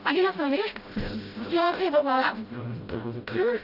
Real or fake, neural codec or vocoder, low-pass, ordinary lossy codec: fake; codec, 16 kHz, 0.5 kbps, FreqCodec, smaller model; 5.4 kHz; AAC, 32 kbps